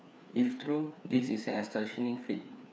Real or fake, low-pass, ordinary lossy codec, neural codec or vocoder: fake; none; none; codec, 16 kHz, 4 kbps, FreqCodec, larger model